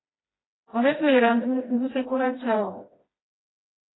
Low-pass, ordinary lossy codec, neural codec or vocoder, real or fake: 7.2 kHz; AAC, 16 kbps; codec, 16 kHz, 1 kbps, FreqCodec, smaller model; fake